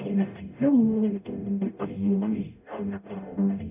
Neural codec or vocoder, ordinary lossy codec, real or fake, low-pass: codec, 44.1 kHz, 0.9 kbps, DAC; none; fake; 3.6 kHz